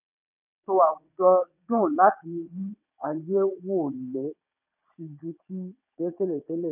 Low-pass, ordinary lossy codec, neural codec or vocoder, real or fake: 3.6 kHz; none; vocoder, 22.05 kHz, 80 mel bands, WaveNeXt; fake